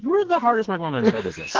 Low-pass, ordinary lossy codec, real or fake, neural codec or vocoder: 7.2 kHz; Opus, 16 kbps; fake; codec, 44.1 kHz, 2.6 kbps, SNAC